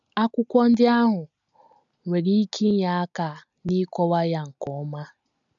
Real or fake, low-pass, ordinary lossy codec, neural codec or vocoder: real; 7.2 kHz; none; none